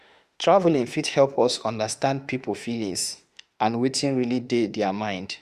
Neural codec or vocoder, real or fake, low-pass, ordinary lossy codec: autoencoder, 48 kHz, 32 numbers a frame, DAC-VAE, trained on Japanese speech; fake; 14.4 kHz; Opus, 64 kbps